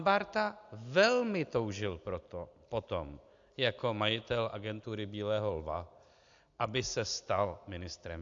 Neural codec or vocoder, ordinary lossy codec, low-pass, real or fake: none; AAC, 64 kbps; 7.2 kHz; real